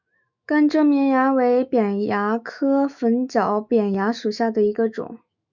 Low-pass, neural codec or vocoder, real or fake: 7.2 kHz; autoencoder, 48 kHz, 128 numbers a frame, DAC-VAE, trained on Japanese speech; fake